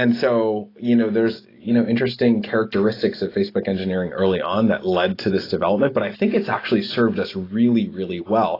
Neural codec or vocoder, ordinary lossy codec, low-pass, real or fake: none; AAC, 24 kbps; 5.4 kHz; real